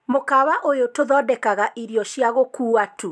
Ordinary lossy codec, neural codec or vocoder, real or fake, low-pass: none; none; real; none